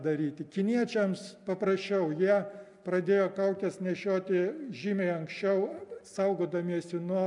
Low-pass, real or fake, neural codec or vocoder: 10.8 kHz; real; none